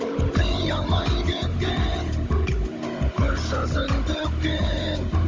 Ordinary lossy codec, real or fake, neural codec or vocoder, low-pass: Opus, 32 kbps; fake; codec, 16 kHz, 16 kbps, FunCodec, trained on Chinese and English, 50 frames a second; 7.2 kHz